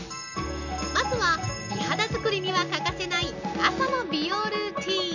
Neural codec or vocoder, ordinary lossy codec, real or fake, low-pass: none; none; real; 7.2 kHz